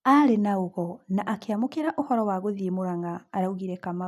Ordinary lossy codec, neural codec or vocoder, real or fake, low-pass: none; none; real; 14.4 kHz